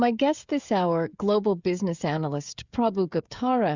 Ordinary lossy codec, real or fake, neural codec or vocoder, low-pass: Opus, 64 kbps; fake; codec, 16 kHz, 16 kbps, FreqCodec, smaller model; 7.2 kHz